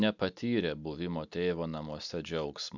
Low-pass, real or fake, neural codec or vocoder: 7.2 kHz; real; none